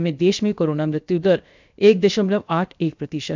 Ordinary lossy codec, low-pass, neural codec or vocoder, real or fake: MP3, 64 kbps; 7.2 kHz; codec, 16 kHz, 0.7 kbps, FocalCodec; fake